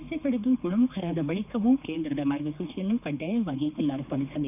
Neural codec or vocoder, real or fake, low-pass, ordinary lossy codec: codec, 16 kHz, 4 kbps, X-Codec, HuBERT features, trained on general audio; fake; 3.6 kHz; none